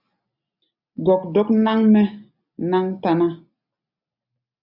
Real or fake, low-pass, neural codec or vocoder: real; 5.4 kHz; none